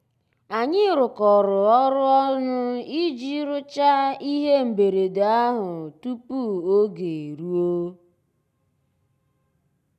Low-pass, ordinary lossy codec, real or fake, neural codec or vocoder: 14.4 kHz; none; real; none